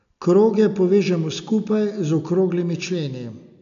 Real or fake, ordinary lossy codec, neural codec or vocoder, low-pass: real; AAC, 96 kbps; none; 7.2 kHz